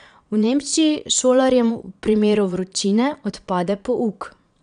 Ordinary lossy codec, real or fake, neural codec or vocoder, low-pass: none; fake; vocoder, 22.05 kHz, 80 mel bands, Vocos; 9.9 kHz